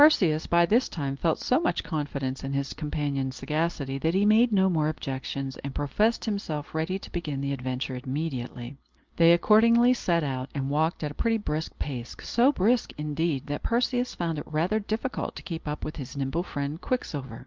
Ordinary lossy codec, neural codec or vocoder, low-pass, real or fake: Opus, 24 kbps; none; 7.2 kHz; real